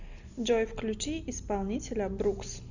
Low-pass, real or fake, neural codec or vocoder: 7.2 kHz; real; none